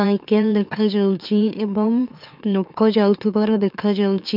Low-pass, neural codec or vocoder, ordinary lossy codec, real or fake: 5.4 kHz; autoencoder, 44.1 kHz, a latent of 192 numbers a frame, MeloTTS; none; fake